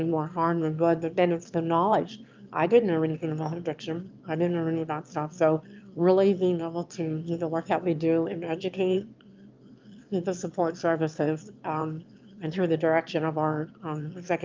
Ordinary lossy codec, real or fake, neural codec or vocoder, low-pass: Opus, 24 kbps; fake; autoencoder, 22.05 kHz, a latent of 192 numbers a frame, VITS, trained on one speaker; 7.2 kHz